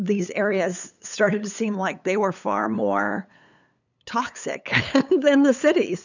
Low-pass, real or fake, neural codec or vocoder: 7.2 kHz; fake; codec, 16 kHz, 8 kbps, FunCodec, trained on LibriTTS, 25 frames a second